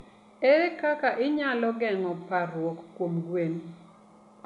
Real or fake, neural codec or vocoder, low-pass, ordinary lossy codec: real; none; 10.8 kHz; MP3, 96 kbps